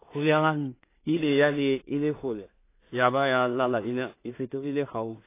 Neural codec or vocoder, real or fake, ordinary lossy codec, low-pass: codec, 16 kHz in and 24 kHz out, 0.4 kbps, LongCat-Audio-Codec, two codebook decoder; fake; AAC, 24 kbps; 3.6 kHz